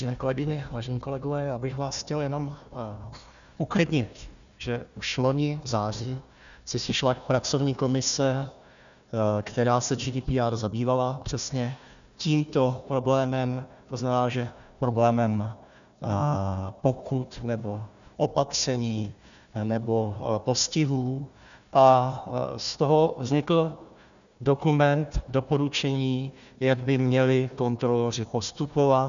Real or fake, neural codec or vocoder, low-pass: fake; codec, 16 kHz, 1 kbps, FunCodec, trained on Chinese and English, 50 frames a second; 7.2 kHz